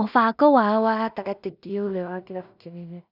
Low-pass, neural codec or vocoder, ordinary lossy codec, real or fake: 5.4 kHz; codec, 16 kHz in and 24 kHz out, 0.4 kbps, LongCat-Audio-Codec, two codebook decoder; none; fake